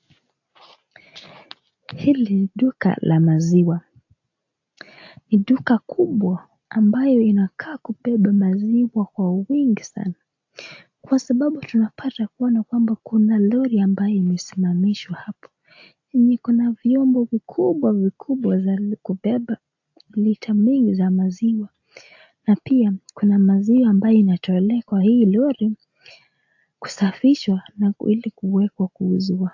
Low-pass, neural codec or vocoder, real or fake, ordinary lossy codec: 7.2 kHz; none; real; AAC, 48 kbps